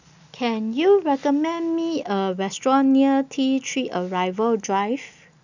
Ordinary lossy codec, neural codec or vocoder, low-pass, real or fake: none; none; 7.2 kHz; real